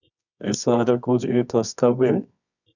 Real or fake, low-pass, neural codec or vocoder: fake; 7.2 kHz; codec, 24 kHz, 0.9 kbps, WavTokenizer, medium music audio release